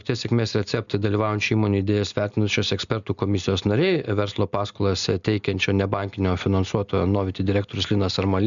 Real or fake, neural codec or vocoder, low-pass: real; none; 7.2 kHz